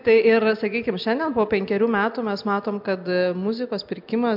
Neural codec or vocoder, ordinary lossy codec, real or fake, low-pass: none; MP3, 48 kbps; real; 5.4 kHz